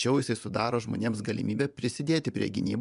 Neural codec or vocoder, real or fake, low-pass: vocoder, 24 kHz, 100 mel bands, Vocos; fake; 10.8 kHz